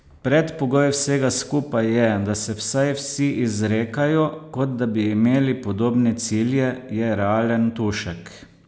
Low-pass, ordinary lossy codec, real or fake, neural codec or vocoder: none; none; real; none